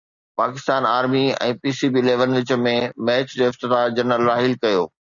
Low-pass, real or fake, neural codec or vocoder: 7.2 kHz; real; none